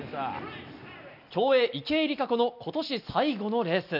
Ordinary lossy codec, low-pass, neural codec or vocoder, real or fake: none; 5.4 kHz; none; real